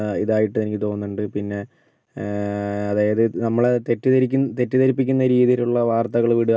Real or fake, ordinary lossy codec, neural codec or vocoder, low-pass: real; none; none; none